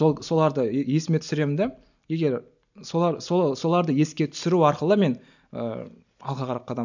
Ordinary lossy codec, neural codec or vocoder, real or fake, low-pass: none; none; real; 7.2 kHz